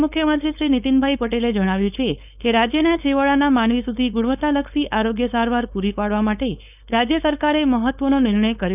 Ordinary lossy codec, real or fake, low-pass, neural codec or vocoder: none; fake; 3.6 kHz; codec, 16 kHz, 4.8 kbps, FACodec